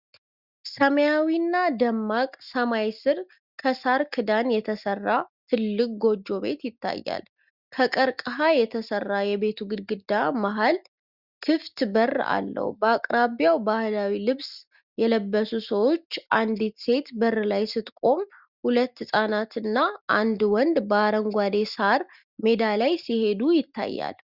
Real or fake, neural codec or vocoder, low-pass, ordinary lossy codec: real; none; 5.4 kHz; Opus, 64 kbps